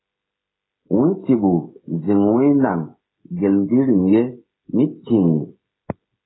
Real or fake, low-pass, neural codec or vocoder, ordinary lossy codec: fake; 7.2 kHz; codec, 16 kHz, 16 kbps, FreqCodec, smaller model; AAC, 16 kbps